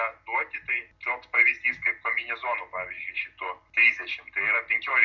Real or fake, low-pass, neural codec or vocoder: real; 7.2 kHz; none